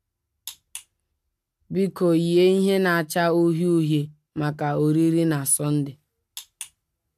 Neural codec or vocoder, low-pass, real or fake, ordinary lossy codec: none; 14.4 kHz; real; AAC, 96 kbps